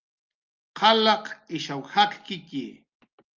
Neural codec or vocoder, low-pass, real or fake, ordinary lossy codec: none; 7.2 kHz; real; Opus, 32 kbps